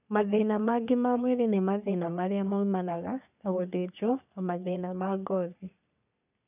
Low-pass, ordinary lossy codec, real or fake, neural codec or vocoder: 3.6 kHz; none; fake; codec, 44.1 kHz, 3.4 kbps, Pupu-Codec